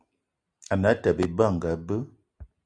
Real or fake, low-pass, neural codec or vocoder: real; 9.9 kHz; none